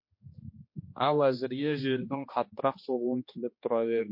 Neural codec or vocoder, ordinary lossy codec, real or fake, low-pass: codec, 16 kHz, 2 kbps, X-Codec, HuBERT features, trained on general audio; MP3, 32 kbps; fake; 5.4 kHz